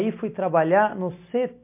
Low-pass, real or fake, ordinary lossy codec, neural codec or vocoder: 3.6 kHz; real; MP3, 24 kbps; none